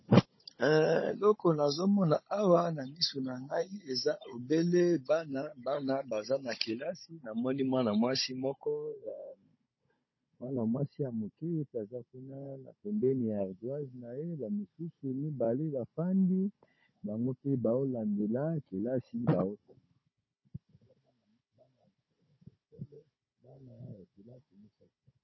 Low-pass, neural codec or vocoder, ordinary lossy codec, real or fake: 7.2 kHz; codec, 16 kHz, 16 kbps, FunCodec, trained on Chinese and English, 50 frames a second; MP3, 24 kbps; fake